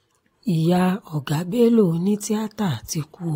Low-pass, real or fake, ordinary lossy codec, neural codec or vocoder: 19.8 kHz; real; AAC, 48 kbps; none